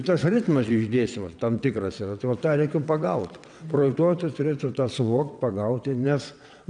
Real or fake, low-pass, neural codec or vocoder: fake; 9.9 kHz; vocoder, 22.05 kHz, 80 mel bands, WaveNeXt